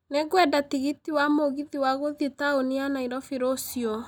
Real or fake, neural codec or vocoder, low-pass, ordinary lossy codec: real; none; 19.8 kHz; none